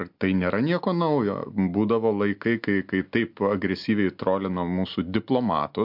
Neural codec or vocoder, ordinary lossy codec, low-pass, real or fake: none; MP3, 48 kbps; 5.4 kHz; real